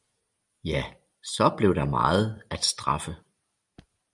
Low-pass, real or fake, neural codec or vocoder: 10.8 kHz; real; none